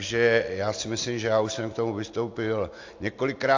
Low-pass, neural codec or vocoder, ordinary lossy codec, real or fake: 7.2 kHz; none; AAC, 48 kbps; real